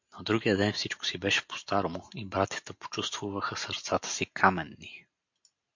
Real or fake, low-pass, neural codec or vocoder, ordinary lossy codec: real; 7.2 kHz; none; MP3, 48 kbps